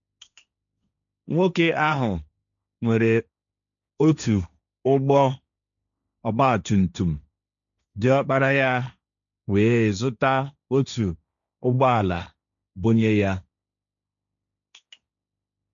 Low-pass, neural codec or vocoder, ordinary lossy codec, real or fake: 7.2 kHz; codec, 16 kHz, 1.1 kbps, Voila-Tokenizer; none; fake